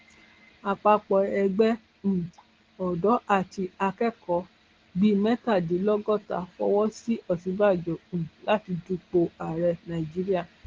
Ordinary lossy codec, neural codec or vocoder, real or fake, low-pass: Opus, 16 kbps; none; real; 7.2 kHz